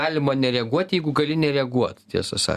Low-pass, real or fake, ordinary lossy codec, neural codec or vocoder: 14.4 kHz; real; Opus, 64 kbps; none